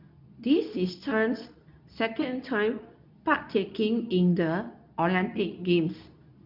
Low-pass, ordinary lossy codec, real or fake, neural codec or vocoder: 5.4 kHz; none; fake; codec, 24 kHz, 0.9 kbps, WavTokenizer, medium speech release version 2